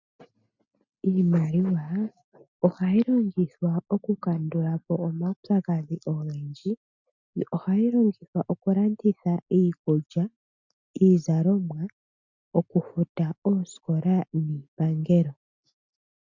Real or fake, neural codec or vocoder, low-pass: real; none; 7.2 kHz